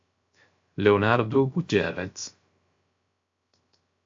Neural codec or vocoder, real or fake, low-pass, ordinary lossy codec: codec, 16 kHz, 0.3 kbps, FocalCodec; fake; 7.2 kHz; AAC, 64 kbps